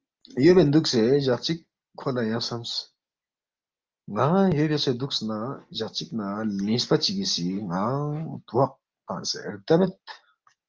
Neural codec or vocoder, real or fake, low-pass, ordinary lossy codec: none; real; 7.2 kHz; Opus, 24 kbps